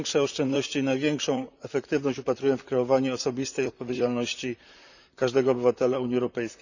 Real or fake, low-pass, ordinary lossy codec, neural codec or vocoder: fake; 7.2 kHz; none; vocoder, 44.1 kHz, 128 mel bands, Pupu-Vocoder